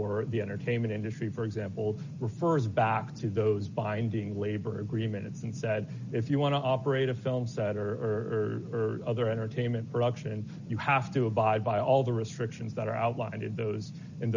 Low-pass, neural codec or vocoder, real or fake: 7.2 kHz; none; real